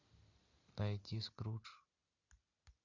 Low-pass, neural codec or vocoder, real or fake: 7.2 kHz; none; real